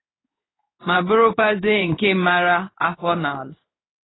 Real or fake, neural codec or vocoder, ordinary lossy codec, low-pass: fake; codec, 16 kHz in and 24 kHz out, 1 kbps, XY-Tokenizer; AAC, 16 kbps; 7.2 kHz